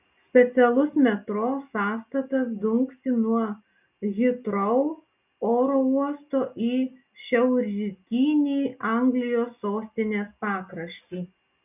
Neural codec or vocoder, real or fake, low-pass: none; real; 3.6 kHz